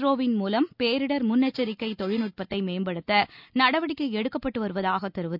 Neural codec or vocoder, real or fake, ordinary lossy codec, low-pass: none; real; none; 5.4 kHz